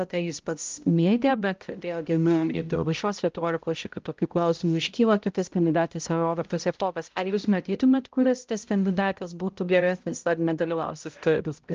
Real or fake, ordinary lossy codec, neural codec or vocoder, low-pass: fake; Opus, 24 kbps; codec, 16 kHz, 0.5 kbps, X-Codec, HuBERT features, trained on balanced general audio; 7.2 kHz